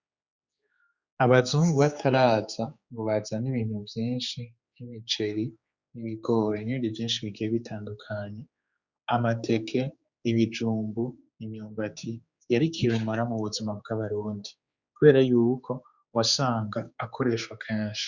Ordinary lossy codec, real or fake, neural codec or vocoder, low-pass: Opus, 64 kbps; fake; codec, 16 kHz, 4 kbps, X-Codec, HuBERT features, trained on general audio; 7.2 kHz